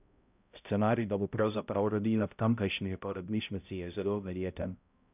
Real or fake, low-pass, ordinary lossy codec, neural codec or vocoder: fake; 3.6 kHz; none; codec, 16 kHz, 0.5 kbps, X-Codec, HuBERT features, trained on balanced general audio